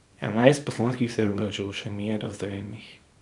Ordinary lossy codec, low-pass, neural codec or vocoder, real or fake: none; 10.8 kHz; codec, 24 kHz, 0.9 kbps, WavTokenizer, small release; fake